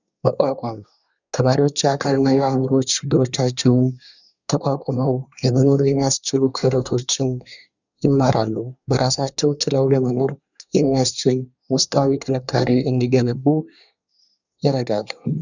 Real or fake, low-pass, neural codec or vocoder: fake; 7.2 kHz; codec, 24 kHz, 1 kbps, SNAC